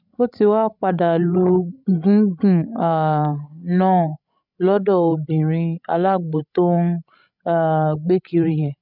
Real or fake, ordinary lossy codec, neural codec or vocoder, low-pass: fake; none; codec, 16 kHz, 16 kbps, FreqCodec, larger model; 5.4 kHz